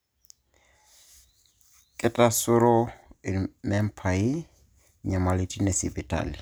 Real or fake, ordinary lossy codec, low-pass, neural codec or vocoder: real; none; none; none